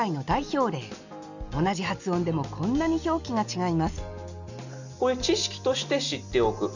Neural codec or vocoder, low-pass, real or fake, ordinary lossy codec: none; 7.2 kHz; real; none